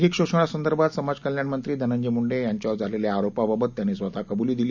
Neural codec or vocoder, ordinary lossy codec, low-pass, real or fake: none; none; 7.2 kHz; real